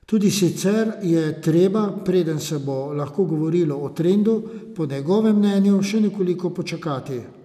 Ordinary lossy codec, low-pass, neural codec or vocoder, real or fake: none; 14.4 kHz; none; real